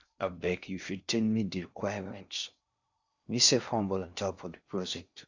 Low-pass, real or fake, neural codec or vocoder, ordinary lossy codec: 7.2 kHz; fake; codec, 16 kHz in and 24 kHz out, 0.6 kbps, FocalCodec, streaming, 4096 codes; Opus, 64 kbps